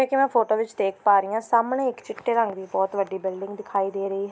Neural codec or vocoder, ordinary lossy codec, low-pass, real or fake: none; none; none; real